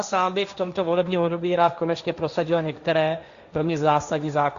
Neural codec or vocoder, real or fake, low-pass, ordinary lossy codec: codec, 16 kHz, 1.1 kbps, Voila-Tokenizer; fake; 7.2 kHz; Opus, 64 kbps